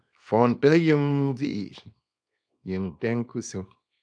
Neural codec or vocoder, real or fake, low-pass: codec, 24 kHz, 0.9 kbps, WavTokenizer, small release; fake; 9.9 kHz